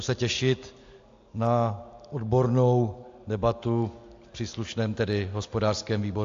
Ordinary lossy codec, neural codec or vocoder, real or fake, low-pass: AAC, 48 kbps; none; real; 7.2 kHz